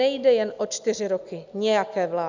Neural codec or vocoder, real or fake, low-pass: none; real; 7.2 kHz